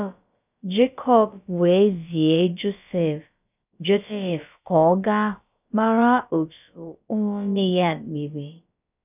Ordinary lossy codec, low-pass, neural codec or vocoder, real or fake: none; 3.6 kHz; codec, 16 kHz, about 1 kbps, DyCAST, with the encoder's durations; fake